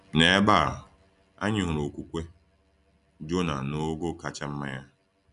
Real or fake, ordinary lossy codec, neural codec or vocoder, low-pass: real; none; none; 10.8 kHz